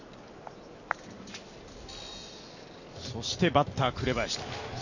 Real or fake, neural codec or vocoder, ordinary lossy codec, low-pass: real; none; none; 7.2 kHz